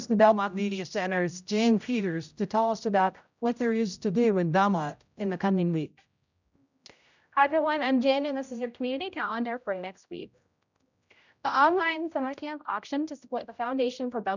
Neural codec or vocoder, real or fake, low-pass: codec, 16 kHz, 0.5 kbps, X-Codec, HuBERT features, trained on general audio; fake; 7.2 kHz